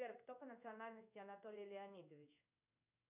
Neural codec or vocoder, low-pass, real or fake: codec, 16 kHz in and 24 kHz out, 1 kbps, XY-Tokenizer; 3.6 kHz; fake